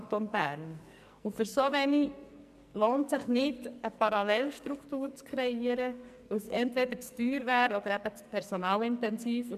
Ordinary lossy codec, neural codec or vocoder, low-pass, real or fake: MP3, 96 kbps; codec, 44.1 kHz, 2.6 kbps, SNAC; 14.4 kHz; fake